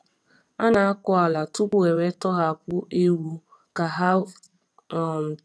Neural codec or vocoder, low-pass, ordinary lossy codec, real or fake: vocoder, 22.05 kHz, 80 mel bands, WaveNeXt; none; none; fake